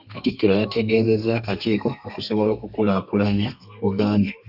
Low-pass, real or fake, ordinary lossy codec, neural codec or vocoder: 5.4 kHz; fake; AAC, 48 kbps; codec, 32 kHz, 1.9 kbps, SNAC